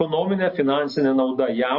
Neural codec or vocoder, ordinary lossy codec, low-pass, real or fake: none; MP3, 32 kbps; 5.4 kHz; real